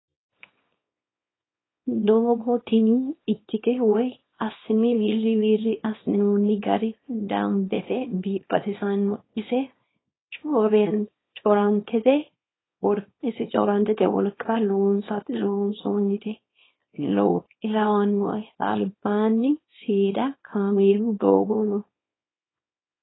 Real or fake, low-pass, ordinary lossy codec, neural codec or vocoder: fake; 7.2 kHz; AAC, 16 kbps; codec, 24 kHz, 0.9 kbps, WavTokenizer, small release